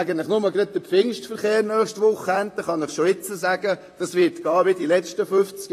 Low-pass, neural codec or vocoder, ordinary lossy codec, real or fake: 14.4 kHz; vocoder, 44.1 kHz, 128 mel bands, Pupu-Vocoder; AAC, 48 kbps; fake